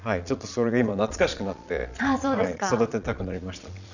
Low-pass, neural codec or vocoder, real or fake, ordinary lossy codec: 7.2 kHz; vocoder, 22.05 kHz, 80 mel bands, Vocos; fake; none